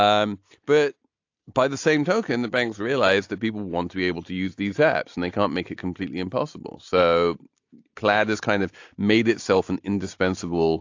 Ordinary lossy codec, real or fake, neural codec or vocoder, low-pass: AAC, 48 kbps; real; none; 7.2 kHz